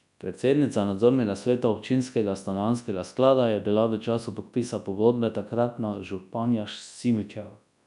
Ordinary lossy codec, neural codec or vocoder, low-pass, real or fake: none; codec, 24 kHz, 0.9 kbps, WavTokenizer, large speech release; 10.8 kHz; fake